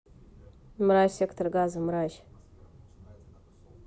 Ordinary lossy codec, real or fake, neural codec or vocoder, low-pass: none; real; none; none